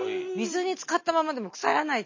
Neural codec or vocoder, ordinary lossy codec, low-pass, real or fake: none; MP3, 32 kbps; 7.2 kHz; real